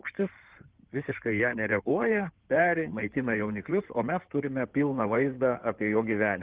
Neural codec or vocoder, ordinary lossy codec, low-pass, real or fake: codec, 16 kHz in and 24 kHz out, 2.2 kbps, FireRedTTS-2 codec; Opus, 16 kbps; 3.6 kHz; fake